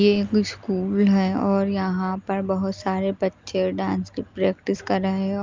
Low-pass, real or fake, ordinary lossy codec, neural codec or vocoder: 7.2 kHz; real; Opus, 32 kbps; none